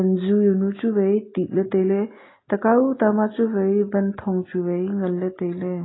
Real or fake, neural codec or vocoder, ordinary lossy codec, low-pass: real; none; AAC, 16 kbps; 7.2 kHz